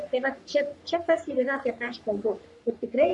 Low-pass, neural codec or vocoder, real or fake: 10.8 kHz; codec, 44.1 kHz, 3.4 kbps, Pupu-Codec; fake